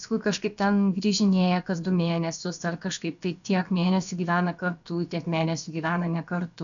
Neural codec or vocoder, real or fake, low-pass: codec, 16 kHz, about 1 kbps, DyCAST, with the encoder's durations; fake; 7.2 kHz